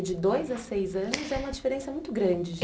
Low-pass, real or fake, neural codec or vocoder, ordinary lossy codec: none; real; none; none